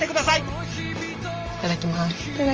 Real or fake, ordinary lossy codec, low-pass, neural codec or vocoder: real; Opus, 24 kbps; 7.2 kHz; none